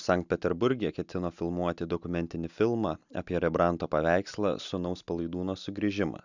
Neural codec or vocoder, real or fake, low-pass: none; real; 7.2 kHz